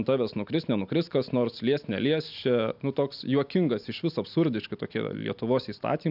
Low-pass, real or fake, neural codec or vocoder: 5.4 kHz; real; none